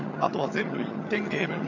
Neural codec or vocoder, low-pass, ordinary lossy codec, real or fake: vocoder, 22.05 kHz, 80 mel bands, HiFi-GAN; 7.2 kHz; none; fake